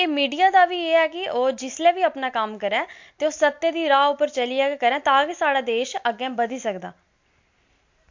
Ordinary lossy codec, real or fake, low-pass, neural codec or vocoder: MP3, 48 kbps; real; 7.2 kHz; none